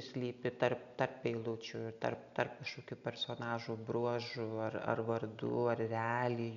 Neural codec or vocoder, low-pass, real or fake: none; 7.2 kHz; real